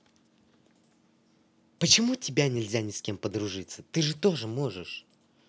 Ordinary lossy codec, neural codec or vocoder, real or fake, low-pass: none; none; real; none